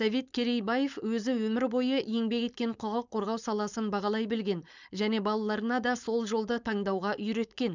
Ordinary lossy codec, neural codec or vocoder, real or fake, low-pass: none; codec, 16 kHz, 4.8 kbps, FACodec; fake; 7.2 kHz